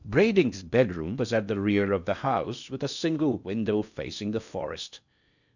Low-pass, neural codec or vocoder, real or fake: 7.2 kHz; codec, 16 kHz in and 24 kHz out, 0.6 kbps, FocalCodec, streaming, 2048 codes; fake